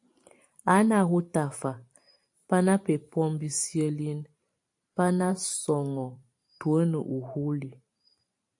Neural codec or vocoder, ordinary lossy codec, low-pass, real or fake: vocoder, 44.1 kHz, 128 mel bands every 512 samples, BigVGAN v2; AAC, 64 kbps; 10.8 kHz; fake